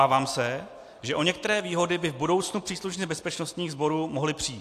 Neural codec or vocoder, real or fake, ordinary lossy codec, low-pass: none; real; AAC, 64 kbps; 14.4 kHz